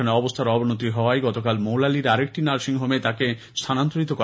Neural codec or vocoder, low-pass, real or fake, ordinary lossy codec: none; none; real; none